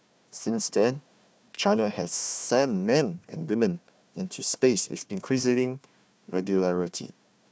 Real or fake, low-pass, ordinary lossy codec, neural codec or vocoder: fake; none; none; codec, 16 kHz, 1 kbps, FunCodec, trained on Chinese and English, 50 frames a second